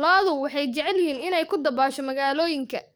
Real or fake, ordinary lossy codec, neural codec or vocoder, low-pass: fake; none; codec, 44.1 kHz, 7.8 kbps, DAC; none